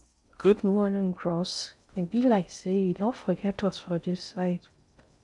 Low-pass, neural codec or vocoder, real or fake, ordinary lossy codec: 10.8 kHz; codec, 16 kHz in and 24 kHz out, 0.6 kbps, FocalCodec, streaming, 4096 codes; fake; none